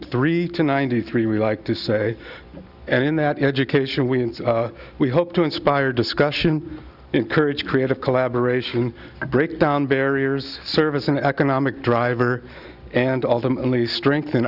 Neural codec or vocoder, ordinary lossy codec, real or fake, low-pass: none; Opus, 64 kbps; real; 5.4 kHz